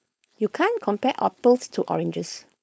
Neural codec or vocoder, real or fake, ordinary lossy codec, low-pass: codec, 16 kHz, 4.8 kbps, FACodec; fake; none; none